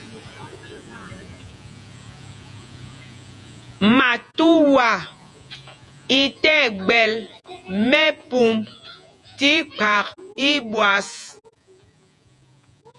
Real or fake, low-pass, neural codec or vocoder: fake; 10.8 kHz; vocoder, 48 kHz, 128 mel bands, Vocos